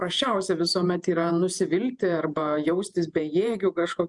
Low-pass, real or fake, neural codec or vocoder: 10.8 kHz; fake; vocoder, 48 kHz, 128 mel bands, Vocos